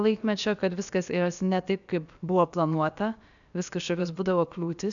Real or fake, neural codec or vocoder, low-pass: fake; codec, 16 kHz, about 1 kbps, DyCAST, with the encoder's durations; 7.2 kHz